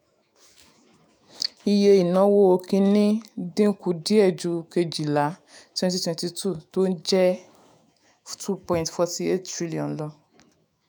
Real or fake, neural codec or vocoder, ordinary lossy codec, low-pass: fake; autoencoder, 48 kHz, 128 numbers a frame, DAC-VAE, trained on Japanese speech; none; none